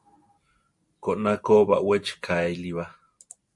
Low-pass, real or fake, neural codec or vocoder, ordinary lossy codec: 10.8 kHz; real; none; MP3, 48 kbps